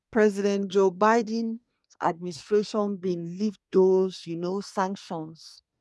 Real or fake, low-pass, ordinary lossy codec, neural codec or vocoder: fake; none; none; codec, 24 kHz, 1 kbps, SNAC